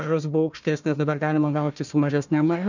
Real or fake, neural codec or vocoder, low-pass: fake; codec, 16 kHz, 1 kbps, FunCodec, trained on Chinese and English, 50 frames a second; 7.2 kHz